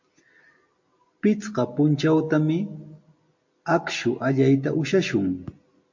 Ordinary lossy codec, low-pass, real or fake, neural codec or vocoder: MP3, 48 kbps; 7.2 kHz; real; none